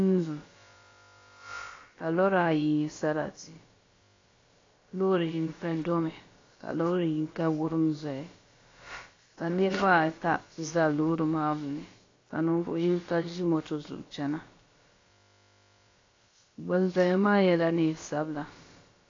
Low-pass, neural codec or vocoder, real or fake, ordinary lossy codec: 7.2 kHz; codec, 16 kHz, about 1 kbps, DyCAST, with the encoder's durations; fake; AAC, 32 kbps